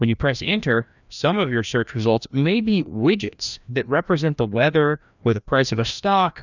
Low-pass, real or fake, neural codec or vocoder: 7.2 kHz; fake; codec, 16 kHz, 1 kbps, FreqCodec, larger model